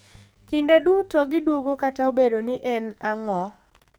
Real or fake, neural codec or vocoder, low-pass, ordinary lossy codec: fake; codec, 44.1 kHz, 2.6 kbps, SNAC; none; none